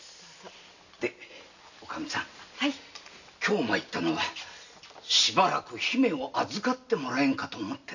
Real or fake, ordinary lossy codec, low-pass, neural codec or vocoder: real; none; 7.2 kHz; none